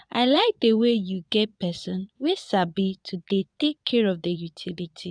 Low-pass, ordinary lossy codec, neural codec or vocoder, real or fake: 9.9 kHz; none; vocoder, 22.05 kHz, 80 mel bands, Vocos; fake